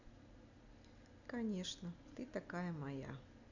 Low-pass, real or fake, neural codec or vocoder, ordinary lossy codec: 7.2 kHz; real; none; none